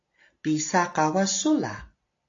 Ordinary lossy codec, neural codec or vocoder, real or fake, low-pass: AAC, 48 kbps; none; real; 7.2 kHz